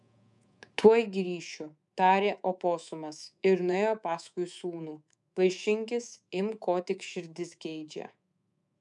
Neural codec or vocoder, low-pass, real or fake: codec, 24 kHz, 3.1 kbps, DualCodec; 10.8 kHz; fake